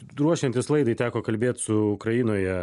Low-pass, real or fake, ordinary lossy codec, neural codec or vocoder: 10.8 kHz; real; AAC, 96 kbps; none